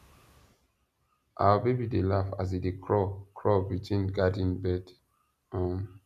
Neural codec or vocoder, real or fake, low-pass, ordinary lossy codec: none; real; 14.4 kHz; none